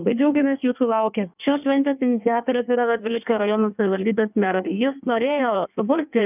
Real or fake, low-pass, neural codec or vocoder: fake; 3.6 kHz; codec, 16 kHz in and 24 kHz out, 1.1 kbps, FireRedTTS-2 codec